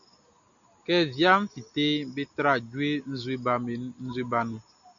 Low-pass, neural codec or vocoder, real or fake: 7.2 kHz; none; real